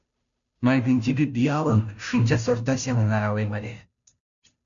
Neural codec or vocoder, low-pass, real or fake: codec, 16 kHz, 0.5 kbps, FunCodec, trained on Chinese and English, 25 frames a second; 7.2 kHz; fake